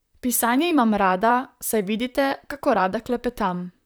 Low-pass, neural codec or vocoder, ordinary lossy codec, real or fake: none; vocoder, 44.1 kHz, 128 mel bands, Pupu-Vocoder; none; fake